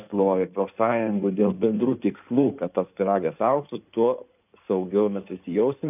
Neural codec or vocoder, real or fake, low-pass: codec, 16 kHz in and 24 kHz out, 2.2 kbps, FireRedTTS-2 codec; fake; 3.6 kHz